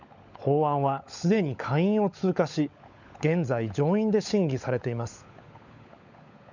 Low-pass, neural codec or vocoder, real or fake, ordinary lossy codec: 7.2 kHz; codec, 16 kHz, 16 kbps, FunCodec, trained on LibriTTS, 50 frames a second; fake; none